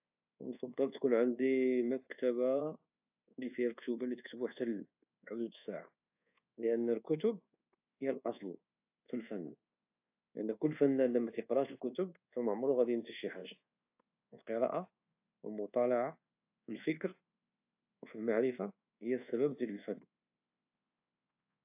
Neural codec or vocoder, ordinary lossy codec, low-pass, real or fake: codec, 24 kHz, 1.2 kbps, DualCodec; AAC, 32 kbps; 3.6 kHz; fake